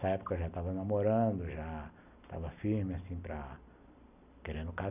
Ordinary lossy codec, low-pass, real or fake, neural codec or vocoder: none; 3.6 kHz; real; none